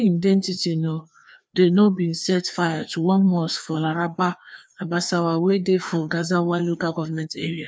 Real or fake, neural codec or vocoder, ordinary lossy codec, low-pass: fake; codec, 16 kHz, 2 kbps, FreqCodec, larger model; none; none